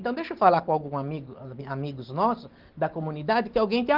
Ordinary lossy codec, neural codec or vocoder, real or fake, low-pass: Opus, 16 kbps; none; real; 5.4 kHz